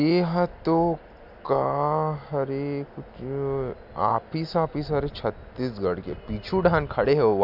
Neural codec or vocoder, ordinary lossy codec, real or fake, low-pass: none; AAC, 48 kbps; real; 5.4 kHz